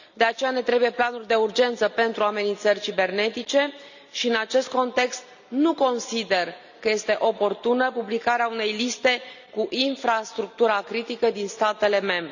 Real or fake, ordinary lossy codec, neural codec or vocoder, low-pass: real; none; none; 7.2 kHz